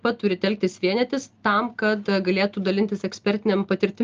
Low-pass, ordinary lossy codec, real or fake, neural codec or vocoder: 7.2 kHz; Opus, 24 kbps; real; none